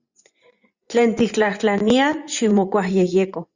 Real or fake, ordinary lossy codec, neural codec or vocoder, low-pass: fake; Opus, 64 kbps; vocoder, 24 kHz, 100 mel bands, Vocos; 7.2 kHz